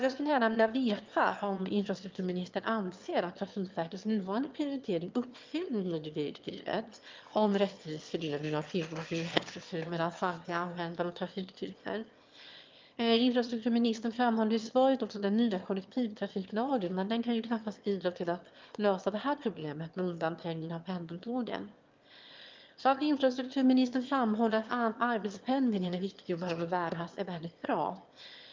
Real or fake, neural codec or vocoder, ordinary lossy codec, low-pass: fake; autoencoder, 22.05 kHz, a latent of 192 numbers a frame, VITS, trained on one speaker; Opus, 24 kbps; 7.2 kHz